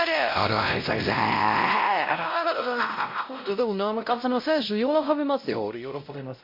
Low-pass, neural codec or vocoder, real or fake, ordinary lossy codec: 5.4 kHz; codec, 16 kHz, 0.5 kbps, X-Codec, WavLM features, trained on Multilingual LibriSpeech; fake; MP3, 32 kbps